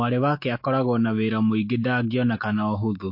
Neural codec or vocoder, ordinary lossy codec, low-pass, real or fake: autoencoder, 48 kHz, 128 numbers a frame, DAC-VAE, trained on Japanese speech; MP3, 32 kbps; 5.4 kHz; fake